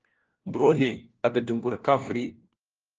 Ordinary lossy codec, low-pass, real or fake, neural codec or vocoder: Opus, 16 kbps; 7.2 kHz; fake; codec, 16 kHz, 1 kbps, FunCodec, trained on LibriTTS, 50 frames a second